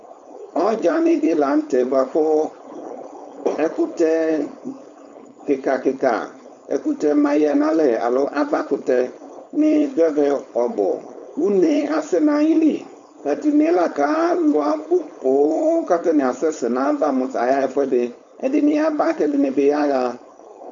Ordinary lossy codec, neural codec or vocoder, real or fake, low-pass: MP3, 64 kbps; codec, 16 kHz, 4.8 kbps, FACodec; fake; 7.2 kHz